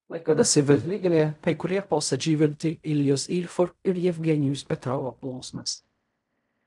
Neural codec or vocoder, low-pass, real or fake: codec, 16 kHz in and 24 kHz out, 0.4 kbps, LongCat-Audio-Codec, fine tuned four codebook decoder; 10.8 kHz; fake